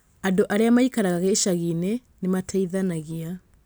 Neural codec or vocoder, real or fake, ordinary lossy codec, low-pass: none; real; none; none